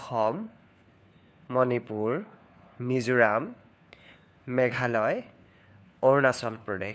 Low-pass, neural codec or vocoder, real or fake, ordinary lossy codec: none; codec, 16 kHz, 4 kbps, FunCodec, trained on LibriTTS, 50 frames a second; fake; none